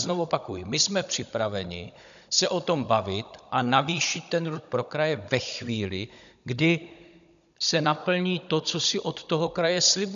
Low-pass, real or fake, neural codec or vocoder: 7.2 kHz; fake; codec, 16 kHz, 16 kbps, FunCodec, trained on Chinese and English, 50 frames a second